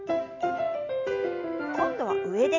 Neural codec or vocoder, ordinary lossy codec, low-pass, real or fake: none; none; 7.2 kHz; real